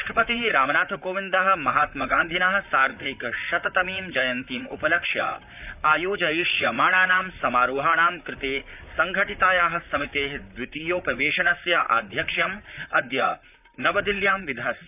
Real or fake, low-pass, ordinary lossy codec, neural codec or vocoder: fake; 3.6 kHz; none; vocoder, 44.1 kHz, 128 mel bands, Pupu-Vocoder